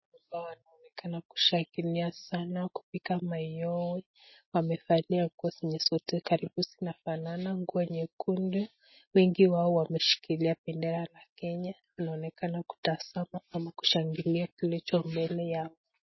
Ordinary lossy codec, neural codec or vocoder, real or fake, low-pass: MP3, 24 kbps; none; real; 7.2 kHz